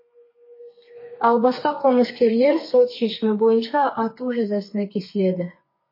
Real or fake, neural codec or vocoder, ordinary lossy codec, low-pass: fake; codec, 32 kHz, 1.9 kbps, SNAC; MP3, 24 kbps; 5.4 kHz